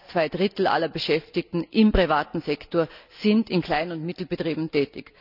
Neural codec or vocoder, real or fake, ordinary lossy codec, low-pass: none; real; none; 5.4 kHz